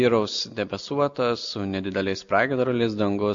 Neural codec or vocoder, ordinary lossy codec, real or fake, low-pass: none; MP3, 48 kbps; real; 7.2 kHz